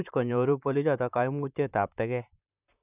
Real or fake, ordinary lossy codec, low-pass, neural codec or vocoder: fake; none; 3.6 kHz; vocoder, 44.1 kHz, 128 mel bands, Pupu-Vocoder